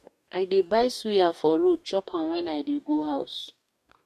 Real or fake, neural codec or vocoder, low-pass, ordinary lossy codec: fake; codec, 44.1 kHz, 2.6 kbps, DAC; 14.4 kHz; none